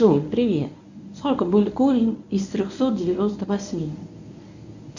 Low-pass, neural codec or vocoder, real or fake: 7.2 kHz; codec, 24 kHz, 0.9 kbps, WavTokenizer, medium speech release version 1; fake